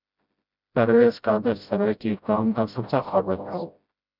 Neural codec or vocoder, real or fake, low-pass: codec, 16 kHz, 0.5 kbps, FreqCodec, smaller model; fake; 5.4 kHz